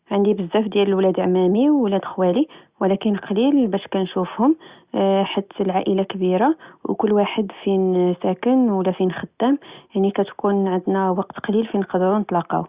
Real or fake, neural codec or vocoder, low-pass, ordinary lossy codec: real; none; 3.6 kHz; Opus, 64 kbps